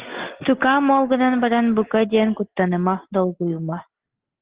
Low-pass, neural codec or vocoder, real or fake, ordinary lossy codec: 3.6 kHz; none; real; Opus, 16 kbps